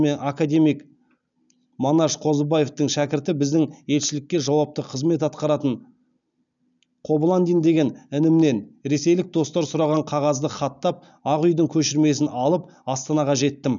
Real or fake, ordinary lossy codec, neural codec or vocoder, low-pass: real; none; none; 7.2 kHz